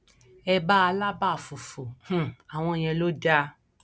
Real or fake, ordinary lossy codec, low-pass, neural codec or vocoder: real; none; none; none